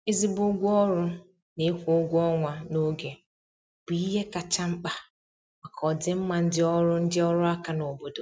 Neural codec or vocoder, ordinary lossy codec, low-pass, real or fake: none; none; none; real